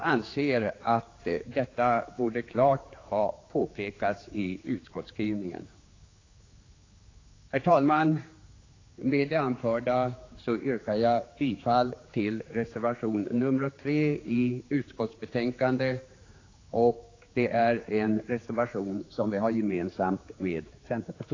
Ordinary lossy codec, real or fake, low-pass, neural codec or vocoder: AAC, 32 kbps; fake; 7.2 kHz; codec, 16 kHz, 4 kbps, X-Codec, HuBERT features, trained on general audio